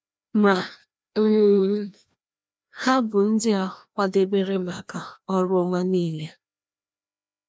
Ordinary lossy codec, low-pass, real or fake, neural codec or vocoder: none; none; fake; codec, 16 kHz, 1 kbps, FreqCodec, larger model